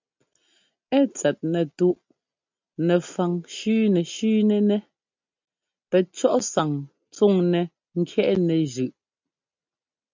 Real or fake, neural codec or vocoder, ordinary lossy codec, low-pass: real; none; MP3, 64 kbps; 7.2 kHz